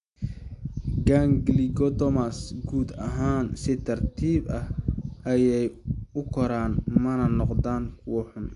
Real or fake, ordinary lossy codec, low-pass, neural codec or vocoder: real; AAC, 64 kbps; 10.8 kHz; none